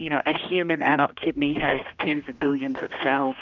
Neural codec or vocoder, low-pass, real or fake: codec, 16 kHz, 1 kbps, X-Codec, HuBERT features, trained on general audio; 7.2 kHz; fake